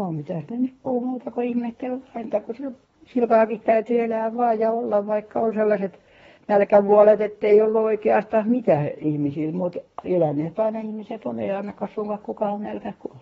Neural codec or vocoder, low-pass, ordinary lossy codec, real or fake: codec, 24 kHz, 3 kbps, HILCodec; 10.8 kHz; AAC, 24 kbps; fake